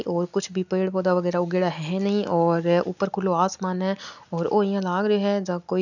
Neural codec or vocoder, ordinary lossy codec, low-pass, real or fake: none; none; 7.2 kHz; real